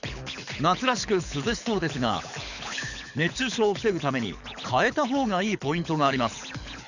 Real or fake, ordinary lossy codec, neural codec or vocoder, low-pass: fake; none; codec, 24 kHz, 6 kbps, HILCodec; 7.2 kHz